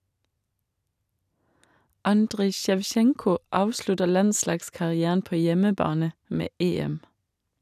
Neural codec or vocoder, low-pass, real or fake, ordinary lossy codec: none; 14.4 kHz; real; none